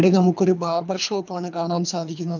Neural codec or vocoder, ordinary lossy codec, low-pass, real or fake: codec, 24 kHz, 3 kbps, HILCodec; none; 7.2 kHz; fake